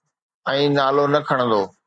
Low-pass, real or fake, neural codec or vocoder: 9.9 kHz; real; none